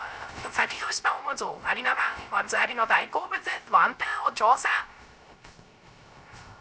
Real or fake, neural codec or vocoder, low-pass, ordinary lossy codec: fake; codec, 16 kHz, 0.3 kbps, FocalCodec; none; none